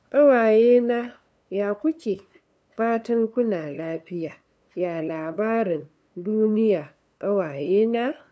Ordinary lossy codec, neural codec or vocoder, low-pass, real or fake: none; codec, 16 kHz, 2 kbps, FunCodec, trained on LibriTTS, 25 frames a second; none; fake